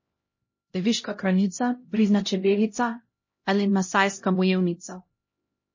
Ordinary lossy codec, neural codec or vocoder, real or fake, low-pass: MP3, 32 kbps; codec, 16 kHz, 0.5 kbps, X-Codec, HuBERT features, trained on LibriSpeech; fake; 7.2 kHz